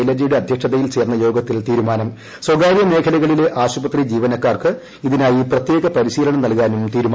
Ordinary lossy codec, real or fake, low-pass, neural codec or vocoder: none; real; none; none